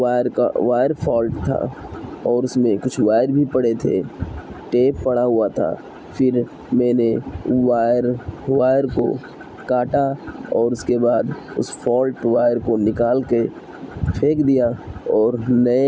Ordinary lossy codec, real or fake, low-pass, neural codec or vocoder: none; real; none; none